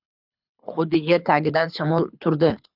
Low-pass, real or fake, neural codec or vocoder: 5.4 kHz; fake; codec, 24 kHz, 6 kbps, HILCodec